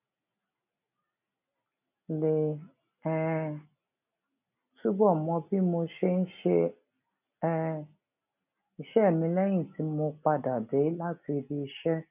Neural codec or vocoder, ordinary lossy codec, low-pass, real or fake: none; none; 3.6 kHz; real